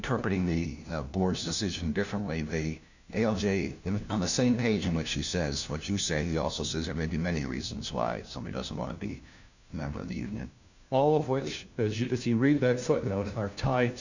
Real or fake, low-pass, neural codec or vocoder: fake; 7.2 kHz; codec, 16 kHz, 1 kbps, FunCodec, trained on LibriTTS, 50 frames a second